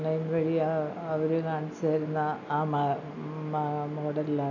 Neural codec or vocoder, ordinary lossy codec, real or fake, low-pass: none; none; real; 7.2 kHz